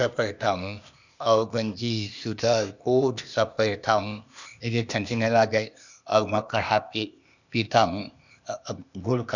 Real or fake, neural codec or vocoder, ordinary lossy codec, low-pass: fake; codec, 16 kHz, 0.8 kbps, ZipCodec; none; 7.2 kHz